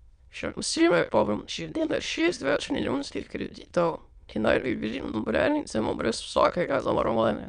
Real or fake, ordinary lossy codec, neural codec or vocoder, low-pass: fake; Opus, 64 kbps; autoencoder, 22.05 kHz, a latent of 192 numbers a frame, VITS, trained on many speakers; 9.9 kHz